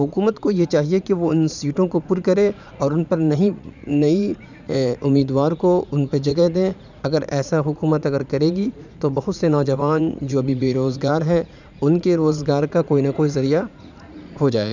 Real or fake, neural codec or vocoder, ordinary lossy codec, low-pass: fake; vocoder, 44.1 kHz, 80 mel bands, Vocos; none; 7.2 kHz